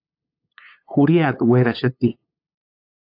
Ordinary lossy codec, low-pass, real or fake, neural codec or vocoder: AAC, 32 kbps; 5.4 kHz; fake; codec, 16 kHz, 8 kbps, FunCodec, trained on LibriTTS, 25 frames a second